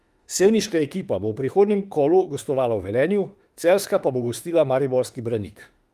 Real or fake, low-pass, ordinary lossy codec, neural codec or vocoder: fake; 14.4 kHz; Opus, 32 kbps; autoencoder, 48 kHz, 32 numbers a frame, DAC-VAE, trained on Japanese speech